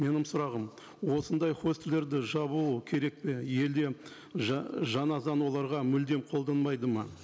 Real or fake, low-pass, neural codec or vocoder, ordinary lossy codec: real; none; none; none